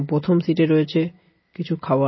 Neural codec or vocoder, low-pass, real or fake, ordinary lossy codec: none; 7.2 kHz; real; MP3, 24 kbps